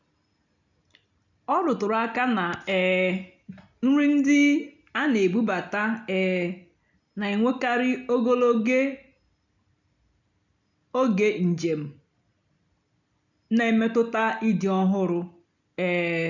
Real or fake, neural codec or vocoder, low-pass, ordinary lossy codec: real; none; 7.2 kHz; none